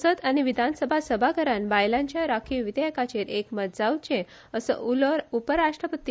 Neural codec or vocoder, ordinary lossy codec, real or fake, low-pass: none; none; real; none